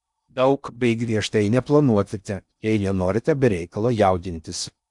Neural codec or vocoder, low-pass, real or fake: codec, 16 kHz in and 24 kHz out, 0.6 kbps, FocalCodec, streaming, 2048 codes; 10.8 kHz; fake